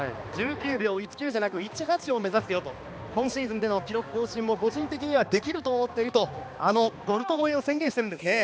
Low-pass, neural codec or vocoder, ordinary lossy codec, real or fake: none; codec, 16 kHz, 2 kbps, X-Codec, HuBERT features, trained on balanced general audio; none; fake